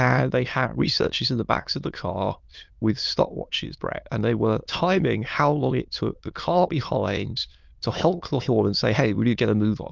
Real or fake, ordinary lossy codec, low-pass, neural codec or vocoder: fake; Opus, 24 kbps; 7.2 kHz; autoencoder, 22.05 kHz, a latent of 192 numbers a frame, VITS, trained on many speakers